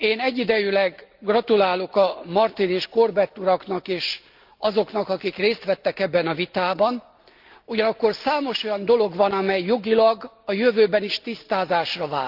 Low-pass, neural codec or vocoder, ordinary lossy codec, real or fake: 5.4 kHz; none; Opus, 32 kbps; real